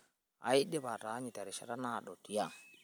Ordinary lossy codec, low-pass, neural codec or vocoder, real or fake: none; none; none; real